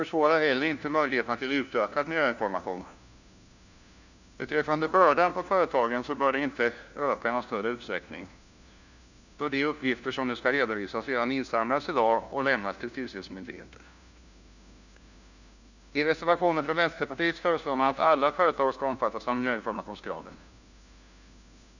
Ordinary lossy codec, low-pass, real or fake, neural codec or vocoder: none; 7.2 kHz; fake; codec, 16 kHz, 1 kbps, FunCodec, trained on LibriTTS, 50 frames a second